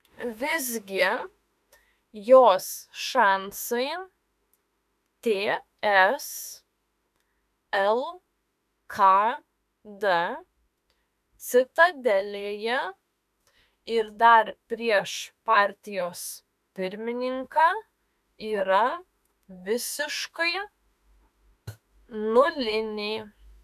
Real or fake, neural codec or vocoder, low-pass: fake; autoencoder, 48 kHz, 32 numbers a frame, DAC-VAE, trained on Japanese speech; 14.4 kHz